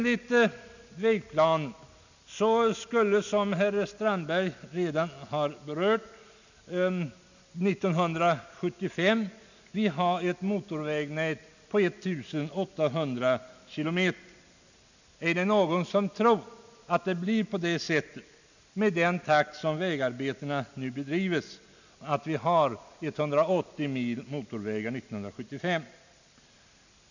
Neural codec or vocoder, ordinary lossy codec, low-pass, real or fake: none; none; 7.2 kHz; real